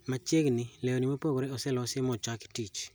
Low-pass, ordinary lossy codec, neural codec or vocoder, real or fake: none; none; none; real